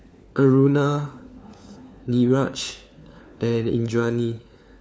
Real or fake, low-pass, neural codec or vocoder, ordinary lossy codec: fake; none; codec, 16 kHz, 4 kbps, FunCodec, trained on LibriTTS, 50 frames a second; none